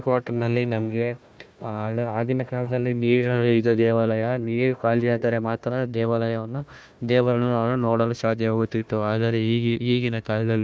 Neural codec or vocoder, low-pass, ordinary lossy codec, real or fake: codec, 16 kHz, 1 kbps, FunCodec, trained on Chinese and English, 50 frames a second; none; none; fake